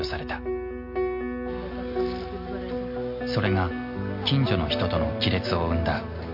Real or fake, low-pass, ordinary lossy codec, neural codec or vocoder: real; 5.4 kHz; MP3, 32 kbps; none